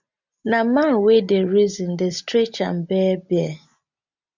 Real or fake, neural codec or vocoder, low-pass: real; none; 7.2 kHz